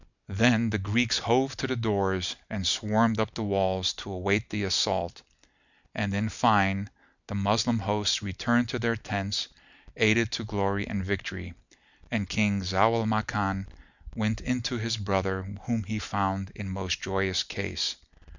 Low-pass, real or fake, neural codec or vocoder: 7.2 kHz; real; none